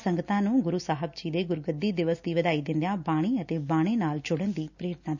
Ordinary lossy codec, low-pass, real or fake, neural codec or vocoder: none; 7.2 kHz; real; none